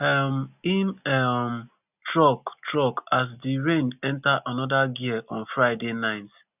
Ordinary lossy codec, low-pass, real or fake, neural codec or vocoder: none; 3.6 kHz; real; none